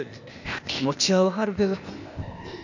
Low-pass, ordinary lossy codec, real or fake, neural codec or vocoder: 7.2 kHz; none; fake; codec, 16 kHz, 0.8 kbps, ZipCodec